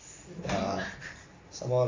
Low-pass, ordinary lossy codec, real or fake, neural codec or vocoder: 7.2 kHz; AAC, 32 kbps; real; none